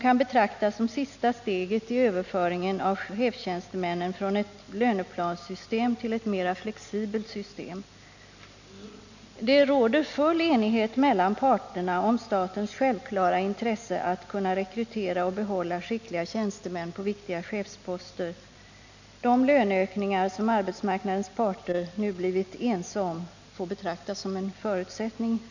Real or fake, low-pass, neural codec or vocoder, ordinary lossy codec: real; 7.2 kHz; none; none